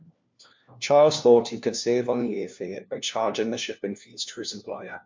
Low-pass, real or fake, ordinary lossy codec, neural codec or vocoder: 7.2 kHz; fake; MP3, 64 kbps; codec, 16 kHz, 1 kbps, FunCodec, trained on LibriTTS, 50 frames a second